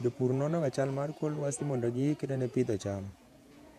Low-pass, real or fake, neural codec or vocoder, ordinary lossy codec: 14.4 kHz; fake; vocoder, 48 kHz, 128 mel bands, Vocos; MP3, 64 kbps